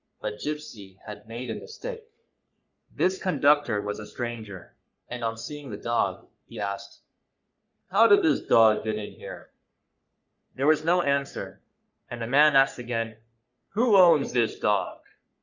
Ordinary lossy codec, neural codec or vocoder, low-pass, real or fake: Opus, 64 kbps; codec, 44.1 kHz, 3.4 kbps, Pupu-Codec; 7.2 kHz; fake